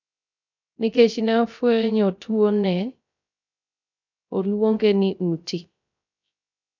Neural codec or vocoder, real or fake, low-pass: codec, 16 kHz, 0.3 kbps, FocalCodec; fake; 7.2 kHz